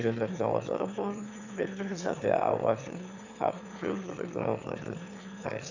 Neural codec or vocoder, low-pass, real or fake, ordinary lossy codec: autoencoder, 22.05 kHz, a latent of 192 numbers a frame, VITS, trained on one speaker; 7.2 kHz; fake; none